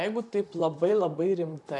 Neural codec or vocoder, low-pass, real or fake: vocoder, 44.1 kHz, 128 mel bands, Pupu-Vocoder; 10.8 kHz; fake